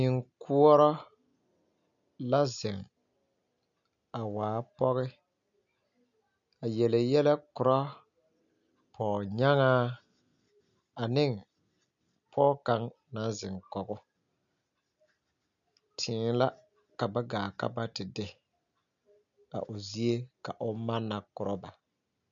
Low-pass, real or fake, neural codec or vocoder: 7.2 kHz; real; none